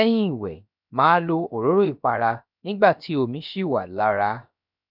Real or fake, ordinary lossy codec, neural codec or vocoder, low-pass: fake; none; codec, 16 kHz, 0.7 kbps, FocalCodec; 5.4 kHz